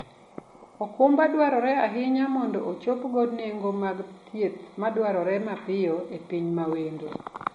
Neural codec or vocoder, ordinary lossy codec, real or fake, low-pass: none; MP3, 48 kbps; real; 19.8 kHz